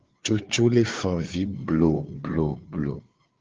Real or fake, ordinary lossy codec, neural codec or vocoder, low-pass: fake; Opus, 16 kbps; codec, 16 kHz, 4 kbps, FreqCodec, larger model; 7.2 kHz